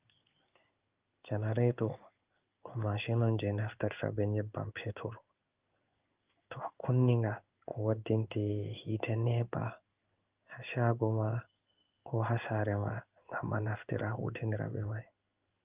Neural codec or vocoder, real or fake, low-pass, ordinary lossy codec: codec, 16 kHz in and 24 kHz out, 1 kbps, XY-Tokenizer; fake; 3.6 kHz; Opus, 32 kbps